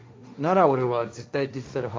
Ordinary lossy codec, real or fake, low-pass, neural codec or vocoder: none; fake; none; codec, 16 kHz, 1.1 kbps, Voila-Tokenizer